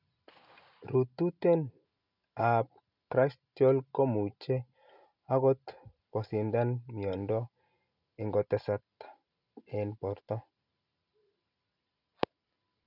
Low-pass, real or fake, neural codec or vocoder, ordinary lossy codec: 5.4 kHz; real; none; none